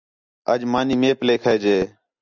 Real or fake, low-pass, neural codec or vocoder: real; 7.2 kHz; none